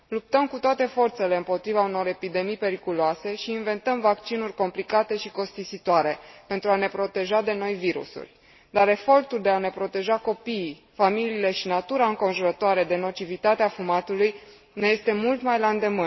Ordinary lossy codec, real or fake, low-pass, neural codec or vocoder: MP3, 24 kbps; real; 7.2 kHz; none